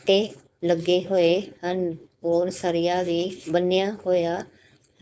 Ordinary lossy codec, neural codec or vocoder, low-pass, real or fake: none; codec, 16 kHz, 4.8 kbps, FACodec; none; fake